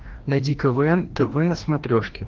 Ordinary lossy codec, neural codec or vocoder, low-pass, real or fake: Opus, 16 kbps; codec, 16 kHz, 1 kbps, FreqCodec, larger model; 7.2 kHz; fake